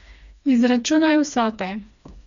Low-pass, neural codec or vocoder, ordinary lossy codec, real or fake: 7.2 kHz; codec, 16 kHz, 2 kbps, FreqCodec, smaller model; none; fake